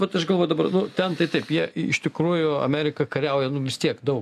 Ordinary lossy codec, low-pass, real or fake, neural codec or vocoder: MP3, 96 kbps; 14.4 kHz; real; none